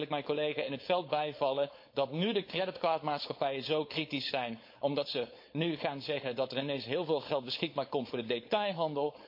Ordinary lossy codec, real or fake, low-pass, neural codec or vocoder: MP3, 32 kbps; fake; 5.4 kHz; codec, 16 kHz, 4.8 kbps, FACodec